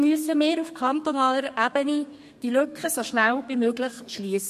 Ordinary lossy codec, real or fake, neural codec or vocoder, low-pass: MP3, 64 kbps; fake; codec, 32 kHz, 1.9 kbps, SNAC; 14.4 kHz